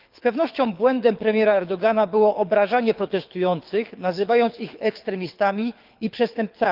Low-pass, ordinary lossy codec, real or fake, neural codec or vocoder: 5.4 kHz; Opus, 24 kbps; fake; codec, 24 kHz, 6 kbps, HILCodec